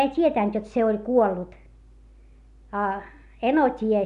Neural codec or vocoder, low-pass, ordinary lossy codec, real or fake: none; 10.8 kHz; none; real